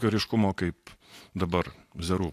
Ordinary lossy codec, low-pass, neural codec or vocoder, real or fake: AAC, 64 kbps; 14.4 kHz; none; real